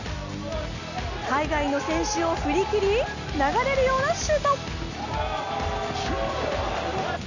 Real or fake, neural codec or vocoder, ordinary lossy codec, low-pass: real; none; none; 7.2 kHz